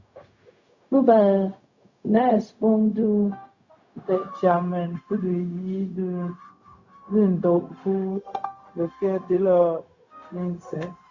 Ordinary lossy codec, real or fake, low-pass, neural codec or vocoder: Opus, 64 kbps; fake; 7.2 kHz; codec, 16 kHz, 0.4 kbps, LongCat-Audio-Codec